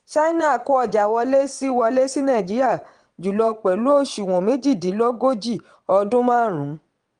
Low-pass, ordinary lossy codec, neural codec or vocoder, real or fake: 9.9 kHz; Opus, 24 kbps; vocoder, 22.05 kHz, 80 mel bands, WaveNeXt; fake